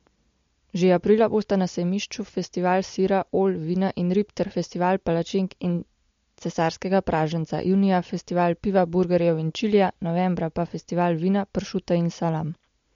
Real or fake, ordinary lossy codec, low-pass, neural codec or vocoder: real; MP3, 48 kbps; 7.2 kHz; none